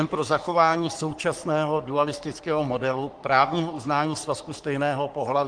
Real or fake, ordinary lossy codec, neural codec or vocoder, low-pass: fake; Opus, 24 kbps; codec, 44.1 kHz, 3.4 kbps, Pupu-Codec; 9.9 kHz